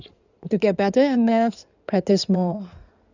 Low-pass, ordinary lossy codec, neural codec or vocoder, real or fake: 7.2 kHz; none; codec, 16 kHz in and 24 kHz out, 2.2 kbps, FireRedTTS-2 codec; fake